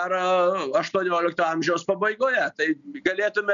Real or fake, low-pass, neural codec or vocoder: real; 7.2 kHz; none